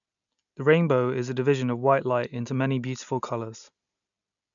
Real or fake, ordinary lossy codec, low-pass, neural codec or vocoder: real; none; 7.2 kHz; none